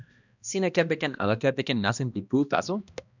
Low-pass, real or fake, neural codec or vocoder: 7.2 kHz; fake; codec, 16 kHz, 1 kbps, X-Codec, HuBERT features, trained on balanced general audio